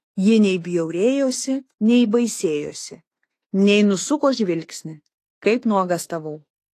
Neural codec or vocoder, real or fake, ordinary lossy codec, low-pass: autoencoder, 48 kHz, 32 numbers a frame, DAC-VAE, trained on Japanese speech; fake; AAC, 48 kbps; 14.4 kHz